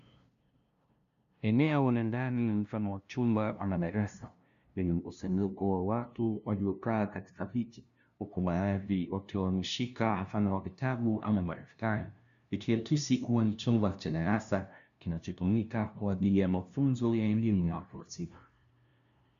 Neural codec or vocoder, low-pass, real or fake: codec, 16 kHz, 0.5 kbps, FunCodec, trained on LibriTTS, 25 frames a second; 7.2 kHz; fake